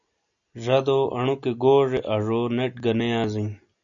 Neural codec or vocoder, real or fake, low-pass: none; real; 7.2 kHz